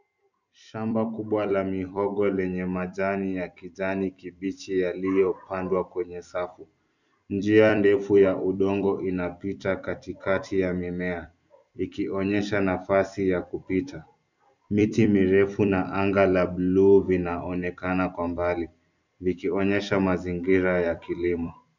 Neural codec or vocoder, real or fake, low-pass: vocoder, 44.1 kHz, 128 mel bands every 256 samples, BigVGAN v2; fake; 7.2 kHz